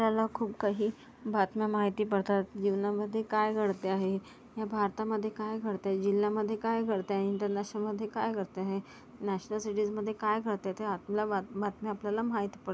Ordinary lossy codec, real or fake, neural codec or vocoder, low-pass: none; real; none; none